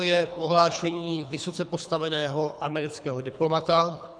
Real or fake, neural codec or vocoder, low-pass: fake; codec, 24 kHz, 3 kbps, HILCodec; 9.9 kHz